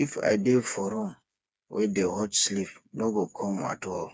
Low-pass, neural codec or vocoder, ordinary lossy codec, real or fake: none; codec, 16 kHz, 4 kbps, FreqCodec, smaller model; none; fake